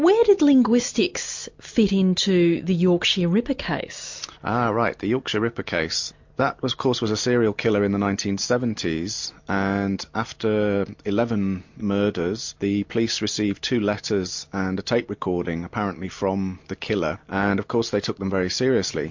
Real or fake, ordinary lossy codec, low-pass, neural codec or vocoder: real; MP3, 48 kbps; 7.2 kHz; none